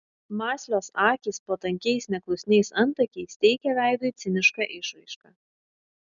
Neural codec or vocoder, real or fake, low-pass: none; real; 7.2 kHz